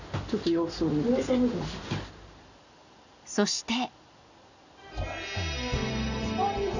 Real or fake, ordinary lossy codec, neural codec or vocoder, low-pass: real; none; none; 7.2 kHz